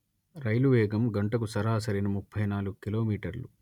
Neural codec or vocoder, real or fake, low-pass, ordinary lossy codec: none; real; 19.8 kHz; none